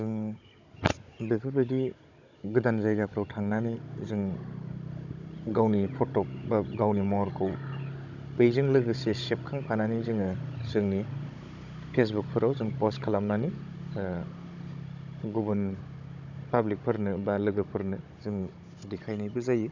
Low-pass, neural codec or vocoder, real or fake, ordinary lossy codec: 7.2 kHz; codec, 16 kHz, 16 kbps, FunCodec, trained on Chinese and English, 50 frames a second; fake; none